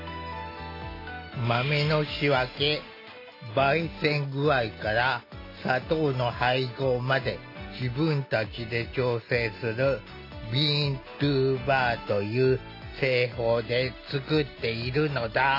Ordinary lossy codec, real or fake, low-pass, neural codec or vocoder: AAC, 32 kbps; real; 5.4 kHz; none